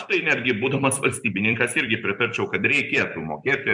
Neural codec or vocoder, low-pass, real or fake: vocoder, 44.1 kHz, 128 mel bands every 256 samples, BigVGAN v2; 10.8 kHz; fake